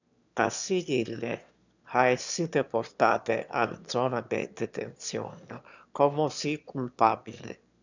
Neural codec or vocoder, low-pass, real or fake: autoencoder, 22.05 kHz, a latent of 192 numbers a frame, VITS, trained on one speaker; 7.2 kHz; fake